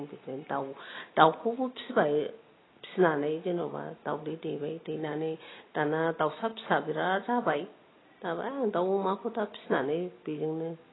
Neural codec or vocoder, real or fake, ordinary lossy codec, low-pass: none; real; AAC, 16 kbps; 7.2 kHz